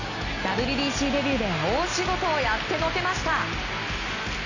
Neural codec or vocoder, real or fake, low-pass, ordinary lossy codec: none; real; 7.2 kHz; none